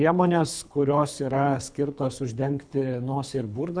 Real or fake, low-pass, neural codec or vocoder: fake; 9.9 kHz; codec, 24 kHz, 3 kbps, HILCodec